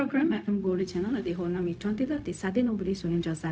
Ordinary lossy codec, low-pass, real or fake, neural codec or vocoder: none; none; fake; codec, 16 kHz, 0.4 kbps, LongCat-Audio-Codec